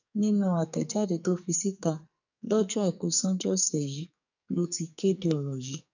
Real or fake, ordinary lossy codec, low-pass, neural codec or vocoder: fake; none; 7.2 kHz; codec, 44.1 kHz, 2.6 kbps, SNAC